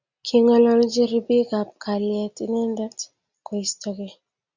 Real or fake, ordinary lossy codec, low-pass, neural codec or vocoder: real; Opus, 64 kbps; 7.2 kHz; none